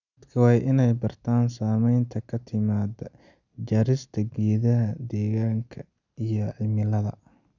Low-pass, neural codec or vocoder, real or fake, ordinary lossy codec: 7.2 kHz; none; real; none